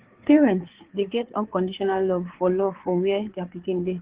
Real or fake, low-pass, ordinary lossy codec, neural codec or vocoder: fake; 3.6 kHz; Opus, 16 kbps; codec, 16 kHz, 4 kbps, FreqCodec, larger model